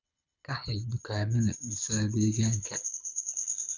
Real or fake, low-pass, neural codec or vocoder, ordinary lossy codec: fake; 7.2 kHz; codec, 24 kHz, 6 kbps, HILCodec; none